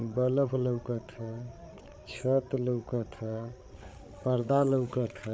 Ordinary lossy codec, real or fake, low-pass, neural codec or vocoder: none; fake; none; codec, 16 kHz, 8 kbps, FreqCodec, larger model